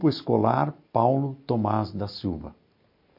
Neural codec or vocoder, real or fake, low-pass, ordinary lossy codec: none; real; 5.4 kHz; MP3, 32 kbps